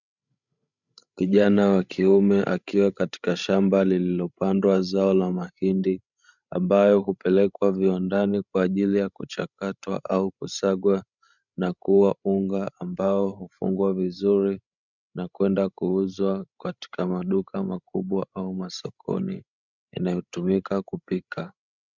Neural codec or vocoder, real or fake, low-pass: codec, 16 kHz, 16 kbps, FreqCodec, larger model; fake; 7.2 kHz